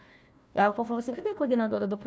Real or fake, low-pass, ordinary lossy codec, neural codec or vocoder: fake; none; none; codec, 16 kHz, 1 kbps, FunCodec, trained on Chinese and English, 50 frames a second